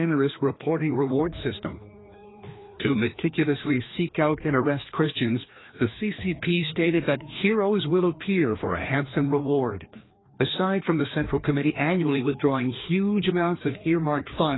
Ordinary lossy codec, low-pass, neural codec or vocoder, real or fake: AAC, 16 kbps; 7.2 kHz; codec, 16 kHz, 2 kbps, FreqCodec, larger model; fake